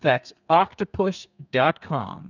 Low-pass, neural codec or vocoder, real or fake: 7.2 kHz; codec, 32 kHz, 1.9 kbps, SNAC; fake